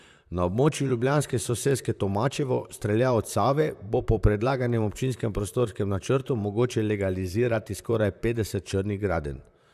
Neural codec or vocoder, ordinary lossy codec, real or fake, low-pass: vocoder, 44.1 kHz, 128 mel bands, Pupu-Vocoder; none; fake; 14.4 kHz